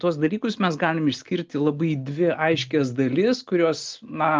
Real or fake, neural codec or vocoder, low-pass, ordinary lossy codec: real; none; 7.2 kHz; Opus, 24 kbps